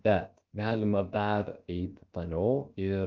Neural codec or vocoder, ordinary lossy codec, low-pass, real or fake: codec, 16 kHz, about 1 kbps, DyCAST, with the encoder's durations; Opus, 24 kbps; 7.2 kHz; fake